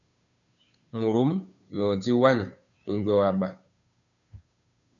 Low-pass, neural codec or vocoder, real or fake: 7.2 kHz; codec, 16 kHz, 2 kbps, FunCodec, trained on Chinese and English, 25 frames a second; fake